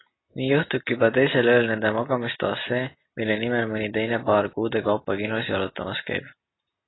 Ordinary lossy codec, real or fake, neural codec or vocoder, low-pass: AAC, 16 kbps; real; none; 7.2 kHz